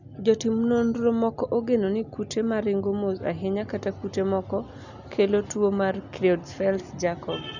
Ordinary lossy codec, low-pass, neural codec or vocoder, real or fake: none; 7.2 kHz; none; real